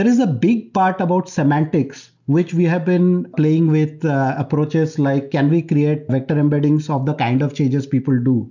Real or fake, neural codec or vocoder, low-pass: real; none; 7.2 kHz